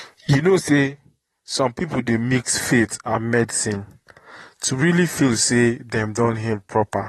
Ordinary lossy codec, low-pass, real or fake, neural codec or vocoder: AAC, 32 kbps; 19.8 kHz; fake; vocoder, 44.1 kHz, 128 mel bands, Pupu-Vocoder